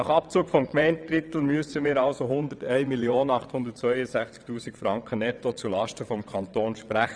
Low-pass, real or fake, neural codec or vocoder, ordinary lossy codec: 9.9 kHz; fake; vocoder, 22.05 kHz, 80 mel bands, WaveNeXt; none